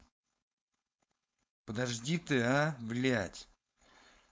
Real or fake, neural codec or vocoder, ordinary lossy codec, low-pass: fake; codec, 16 kHz, 4.8 kbps, FACodec; none; none